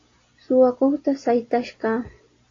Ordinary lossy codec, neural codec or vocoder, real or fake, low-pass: AAC, 32 kbps; none; real; 7.2 kHz